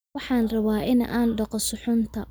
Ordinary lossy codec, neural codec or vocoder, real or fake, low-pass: none; none; real; none